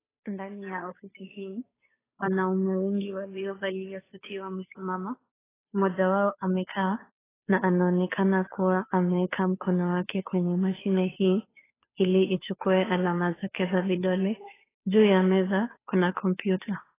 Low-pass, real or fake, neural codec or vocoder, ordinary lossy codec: 3.6 kHz; fake; codec, 16 kHz, 2 kbps, FunCodec, trained on Chinese and English, 25 frames a second; AAC, 16 kbps